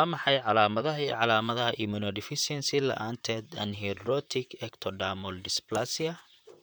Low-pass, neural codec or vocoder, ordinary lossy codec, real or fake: none; vocoder, 44.1 kHz, 128 mel bands, Pupu-Vocoder; none; fake